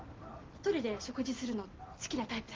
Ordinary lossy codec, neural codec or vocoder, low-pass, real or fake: Opus, 16 kbps; none; 7.2 kHz; real